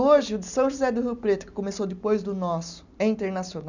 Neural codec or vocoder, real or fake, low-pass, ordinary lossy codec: none; real; 7.2 kHz; none